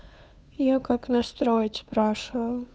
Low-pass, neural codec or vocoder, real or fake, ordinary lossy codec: none; codec, 16 kHz, 2 kbps, FunCodec, trained on Chinese and English, 25 frames a second; fake; none